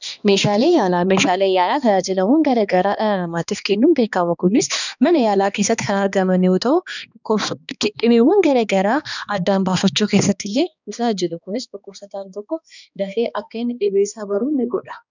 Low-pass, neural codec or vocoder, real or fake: 7.2 kHz; codec, 16 kHz, 2 kbps, X-Codec, HuBERT features, trained on balanced general audio; fake